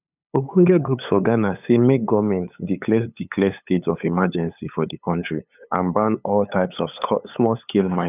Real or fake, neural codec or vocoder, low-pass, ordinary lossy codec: fake; codec, 16 kHz, 8 kbps, FunCodec, trained on LibriTTS, 25 frames a second; 3.6 kHz; none